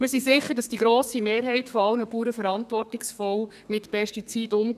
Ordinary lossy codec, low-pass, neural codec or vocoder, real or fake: none; 14.4 kHz; codec, 32 kHz, 1.9 kbps, SNAC; fake